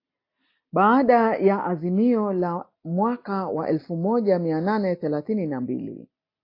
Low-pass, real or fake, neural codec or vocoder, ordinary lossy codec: 5.4 kHz; real; none; AAC, 32 kbps